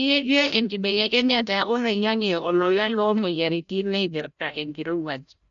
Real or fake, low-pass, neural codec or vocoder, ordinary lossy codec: fake; 7.2 kHz; codec, 16 kHz, 0.5 kbps, FreqCodec, larger model; none